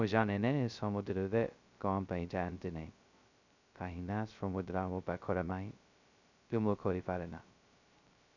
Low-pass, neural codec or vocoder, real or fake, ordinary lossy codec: 7.2 kHz; codec, 16 kHz, 0.2 kbps, FocalCodec; fake; none